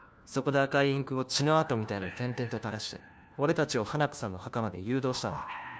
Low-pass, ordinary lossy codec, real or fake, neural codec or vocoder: none; none; fake; codec, 16 kHz, 1 kbps, FunCodec, trained on LibriTTS, 50 frames a second